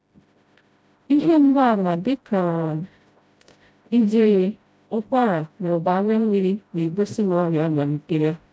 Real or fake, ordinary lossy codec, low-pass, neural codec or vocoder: fake; none; none; codec, 16 kHz, 0.5 kbps, FreqCodec, smaller model